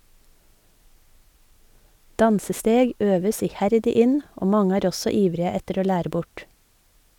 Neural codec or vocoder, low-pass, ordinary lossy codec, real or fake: none; 19.8 kHz; none; real